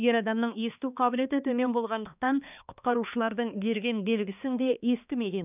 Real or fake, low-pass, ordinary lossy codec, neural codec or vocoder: fake; 3.6 kHz; none; codec, 16 kHz, 2 kbps, X-Codec, HuBERT features, trained on balanced general audio